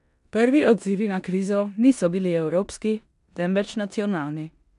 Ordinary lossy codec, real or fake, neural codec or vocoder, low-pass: none; fake; codec, 16 kHz in and 24 kHz out, 0.9 kbps, LongCat-Audio-Codec, four codebook decoder; 10.8 kHz